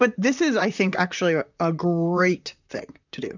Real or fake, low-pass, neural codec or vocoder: fake; 7.2 kHz; vocoder, 44.1 kHz, 128 mel bands, Pupu-Vocoder